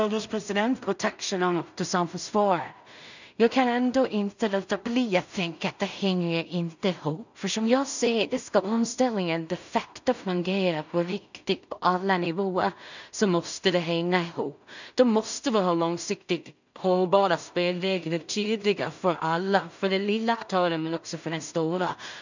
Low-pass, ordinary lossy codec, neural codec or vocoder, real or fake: 7.2 kHz; none; codec, 16 kHz in and 24 kHz out, 0.4 kbps, LongCat-Audio-Codec, two codebook decoder; fake